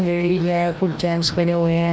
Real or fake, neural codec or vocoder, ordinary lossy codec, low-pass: fake; codec, 16 kHz, 1 kbps, FreqCodec, larger model; none; none